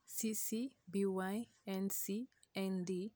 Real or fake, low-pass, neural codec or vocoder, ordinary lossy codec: real; none; none; none